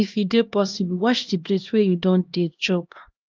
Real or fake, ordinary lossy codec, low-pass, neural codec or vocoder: fake; Opus, 32 kbps; 7.2 kHz; codec, 16 kHz, 1 kbps, X-Codec, HuBERT features, trained on LibriSpeech